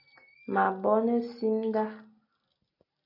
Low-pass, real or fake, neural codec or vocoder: 5.4 kHz; real; none